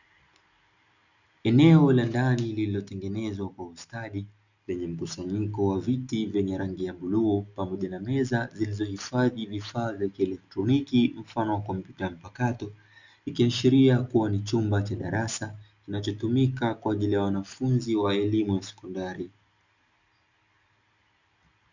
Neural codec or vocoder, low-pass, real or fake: none; 7.2 kHz; real